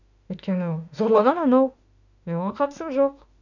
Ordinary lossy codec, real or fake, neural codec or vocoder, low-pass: none; fake; autoencoder, 48 kHz, 32 numbers a frame, DAC-VAE, trained on Japanese speech; 7.2 kHz